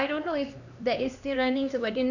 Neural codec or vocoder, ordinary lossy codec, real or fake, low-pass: codec, 16 kHz, 2 kbps, X-Codec, HuBERT features, trained on LibriSpeech; none; fake; 7.2 kHz